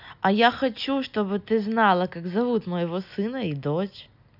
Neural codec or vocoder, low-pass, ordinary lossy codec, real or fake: none; 5.4 kHz; none; real